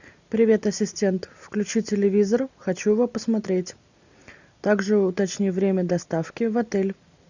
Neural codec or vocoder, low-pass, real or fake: none; 7.2 kHz; real